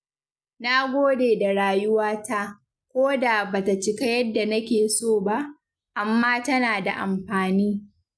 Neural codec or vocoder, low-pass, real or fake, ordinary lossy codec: none; none; real; none